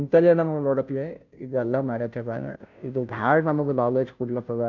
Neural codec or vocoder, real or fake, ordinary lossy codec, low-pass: codec, 16 kHz, 0.5 kbps, FunCodec, trained on Chinese and English, 25 frames a second; fake; none; 7.2 kHz